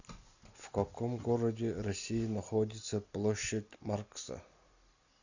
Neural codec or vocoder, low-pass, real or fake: none; 7.2 kHz; real